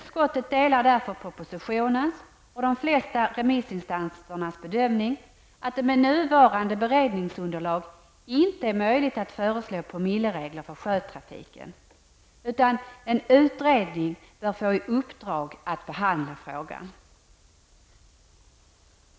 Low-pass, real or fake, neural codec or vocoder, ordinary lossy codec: none; real; none; none